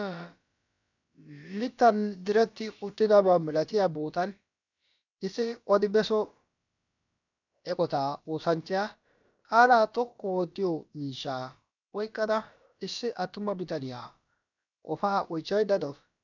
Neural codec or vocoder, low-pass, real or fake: codec, 16 kHz, about 1 kbps, DyCAST, with the encoder's durations; 7.2 kHz; fake